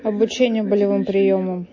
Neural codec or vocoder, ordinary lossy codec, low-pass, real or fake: none; MP3, 32 kbps; 7.2 kHz; real